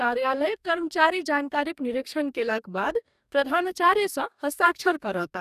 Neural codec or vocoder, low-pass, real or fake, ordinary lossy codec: codec, 44.1 kHz, 2.6 kbps, DAC; 14.4 kHz; fake; none